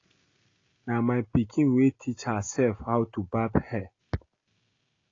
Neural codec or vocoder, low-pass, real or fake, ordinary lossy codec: none; 7.2 kHz; real; AAC, 32 kbps